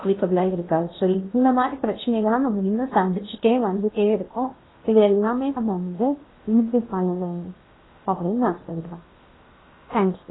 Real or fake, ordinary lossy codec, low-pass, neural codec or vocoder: fake; AAC, 16 kbps; 7.2 kHz; codec, 16 kHz in and 24 kHz out, 0.8 kbps, FocalCodec, streaming, 65536 codes